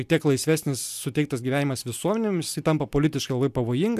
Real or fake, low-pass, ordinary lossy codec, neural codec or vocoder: real; 14.4 kHz; MP3, 96 kbps; none